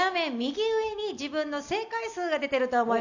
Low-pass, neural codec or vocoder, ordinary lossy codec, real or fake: 7.2 kHz; none; none; real